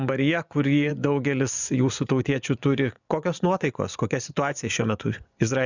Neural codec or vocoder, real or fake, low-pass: vocoder, 24 kHz, 100 mel bands, Vocos; fake; 7.2 kHz